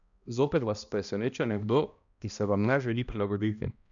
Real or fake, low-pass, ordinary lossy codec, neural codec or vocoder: fake; 7.2 kHz; none; codec, 16 kHz, 1 kbps, X-Codec, HuBERT features, trained on balanced general audio